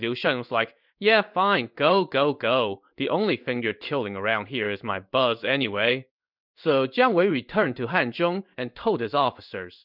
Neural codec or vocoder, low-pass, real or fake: codec, 16 kHz in and 24 kHz out, 1 kbps, XY-Tokenizer; 5.4 kHz; fake